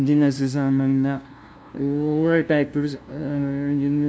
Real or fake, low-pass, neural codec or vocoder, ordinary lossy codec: fake; none; codec, 16 kHz, 0.5 kbps, FunCodec, trained on LibriTTS, 25 frames a second; none